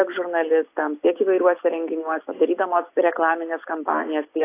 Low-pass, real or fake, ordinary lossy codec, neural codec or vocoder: 3.6 kHz; real; AAC, 24 kbps; none